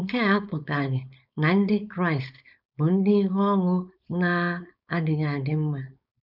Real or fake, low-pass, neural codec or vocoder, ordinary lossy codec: fake; 5.4 kHz; codec, 16 kHz, 4.8 kbps, FACodec; none